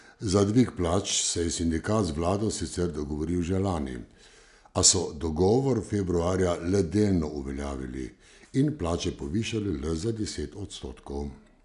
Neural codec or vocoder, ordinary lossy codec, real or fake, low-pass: none; none; real; 10.8 kHz